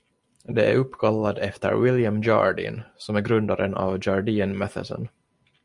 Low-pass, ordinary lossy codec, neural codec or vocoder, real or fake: 10.8 kHz; Opus, 64 kbps; none; real